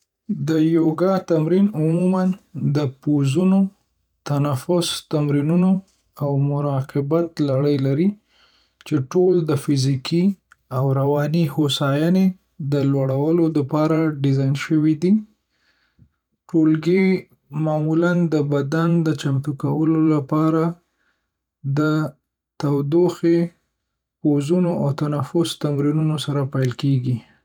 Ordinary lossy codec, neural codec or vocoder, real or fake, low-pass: none; vocoder, 44.1 kHz, 128 mel bands every 512 samples, BigVGAN v2; fake; 19.8 kHz